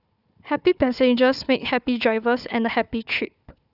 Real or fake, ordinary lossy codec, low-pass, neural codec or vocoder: fake; none; 5.4 kHz; codec, 16 kHz, 4 kbps, FunCodec, trained on Chinese and English, 50 frames a second